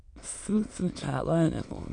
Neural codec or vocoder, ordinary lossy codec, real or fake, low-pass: autoencoder, 22.05 kHz, a latent of 192 numbers a frame, VITS, trained on many speakers; MP3, 64 kbps; fake; 9.9 kHz